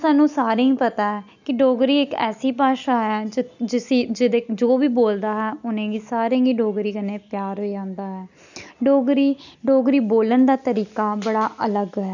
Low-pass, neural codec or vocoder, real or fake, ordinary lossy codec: 7.2 kHz; none; real; none